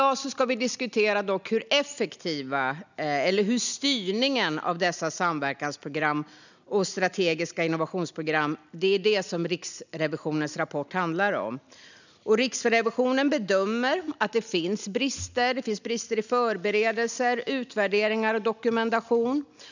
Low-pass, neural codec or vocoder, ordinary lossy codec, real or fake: 7.2 kHz; none; none; real